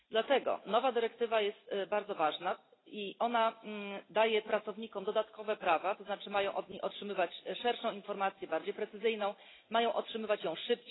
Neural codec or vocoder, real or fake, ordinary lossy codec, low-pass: none; real; AAC, 16 kbps; 7.2 kHz